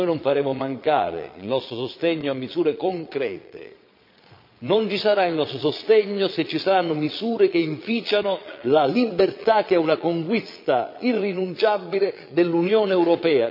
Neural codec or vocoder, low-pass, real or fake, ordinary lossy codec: vocoder, 22.05 kHz, 80 mel bands, Vocos; 5.4 kHz; fake; MP3, 48 kbps